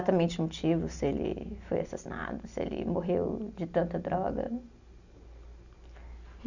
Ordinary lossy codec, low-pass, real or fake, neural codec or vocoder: none; 7.2 kHz; real; none